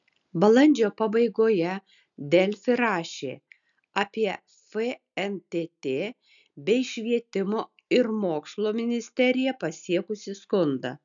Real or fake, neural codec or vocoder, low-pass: real; none; 7.2 kHz